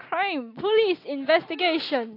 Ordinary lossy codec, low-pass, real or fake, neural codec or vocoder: AAC, 32 kbps; 5.4 kHz; real; none